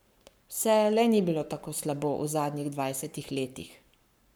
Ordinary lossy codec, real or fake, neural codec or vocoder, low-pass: none; fake; codec, 44.1 kHz, 7.8 kbps, Pupu-Codec; none